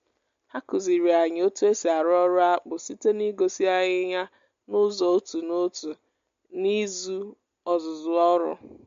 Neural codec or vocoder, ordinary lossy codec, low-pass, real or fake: none; MP3, 48 kbps; 7.2 kHz; real